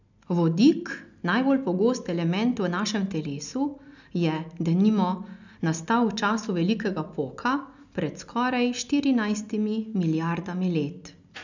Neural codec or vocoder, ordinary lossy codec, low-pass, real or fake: none; none; 7.2 kHz; real